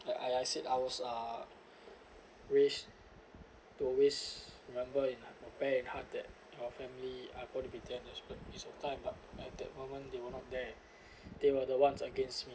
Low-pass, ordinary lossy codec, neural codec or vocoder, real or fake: none; none; none; real